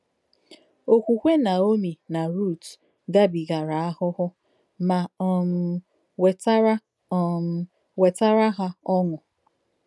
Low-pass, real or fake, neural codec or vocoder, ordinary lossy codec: none; real; none; none